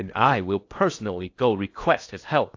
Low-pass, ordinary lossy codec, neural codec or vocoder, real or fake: 7.2 kHz; AAC, 48 kbps; codec, 16 kHz in and 24 kHz out, 0.8 kbps, FocalCodec, streaming, 65536 codes; fake